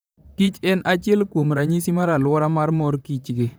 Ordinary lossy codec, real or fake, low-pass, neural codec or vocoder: none; fake; none; vocoder, 44.1 kHz, 128 mel bands, Pupu-Vocoder